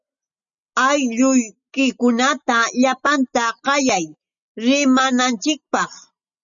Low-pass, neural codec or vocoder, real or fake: 7.2 kHz; none; real